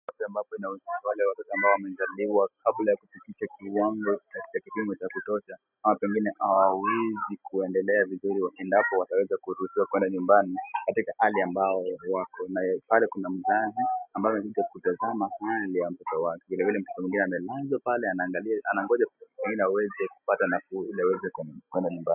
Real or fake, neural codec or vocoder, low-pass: real; none; 3.6 kHz